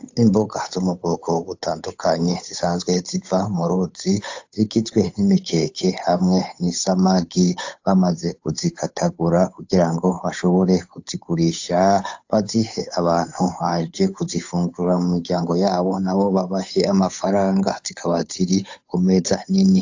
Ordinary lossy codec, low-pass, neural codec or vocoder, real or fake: AAC, 48 kbps; 7.2 kHz; codec, 16 kHz, 8 kbps, FunCodec, trained on Chinese and English, 25 frames a second; fake